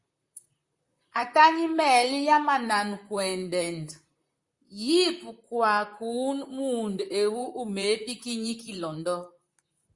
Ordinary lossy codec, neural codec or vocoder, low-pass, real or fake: Opus, 64 kbps; vocoder, 44.1 kHz, 128 mel bands, Pupu-Vocoder; 10.8 kHz; fake